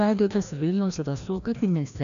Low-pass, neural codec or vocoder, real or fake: 7.2 kHz; codec, 16 kHz, 1 kbps, FreqCodec, larger model; fake